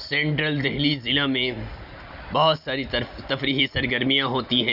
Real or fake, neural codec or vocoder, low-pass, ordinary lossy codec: real; none; 5.4 kHz; none